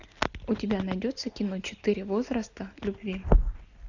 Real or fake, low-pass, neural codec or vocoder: real; 7.2 kHz; none